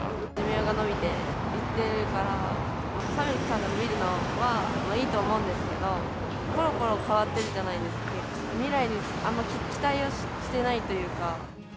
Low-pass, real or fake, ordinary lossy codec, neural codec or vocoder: none; real; none; none